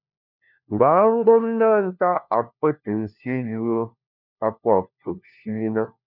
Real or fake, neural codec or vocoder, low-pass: fake; codec, 16 kHz, 1 kbps, FunCodec, trained on LibriTTS, 50 frames a second; 5.4 kHz